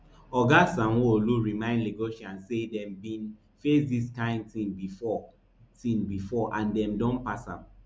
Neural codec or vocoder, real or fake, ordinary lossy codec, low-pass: none; real; none; none